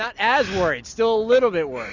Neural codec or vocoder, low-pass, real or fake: none; 7.2 kHz; real